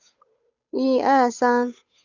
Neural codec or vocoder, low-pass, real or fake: codec, 16 kHz, 8 kbps, FunCodec, trained on Chinese and English, 25 frames a second; 7.2 kHz; fake